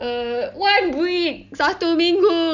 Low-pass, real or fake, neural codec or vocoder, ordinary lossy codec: 7.2 kHz; real; none; none